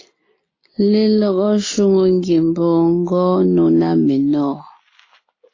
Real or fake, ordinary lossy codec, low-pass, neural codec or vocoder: real; AAC, 32 kbps; 7.2 kHz; none